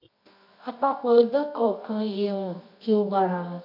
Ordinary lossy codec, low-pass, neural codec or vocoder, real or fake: MP3, 48 kbps; 5.4 kHz; codec, 24 kHz, 0.9 kbps, WavTokenizer, medium music audio release; fake